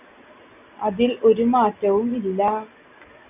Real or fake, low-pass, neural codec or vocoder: real; 3.6 kHz; none